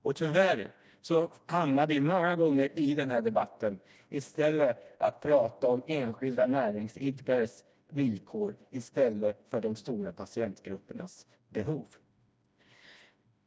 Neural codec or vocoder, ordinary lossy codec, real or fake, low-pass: codec, 16 kHz, 1 kbps, FreqCodec, smaller model; none; fake; none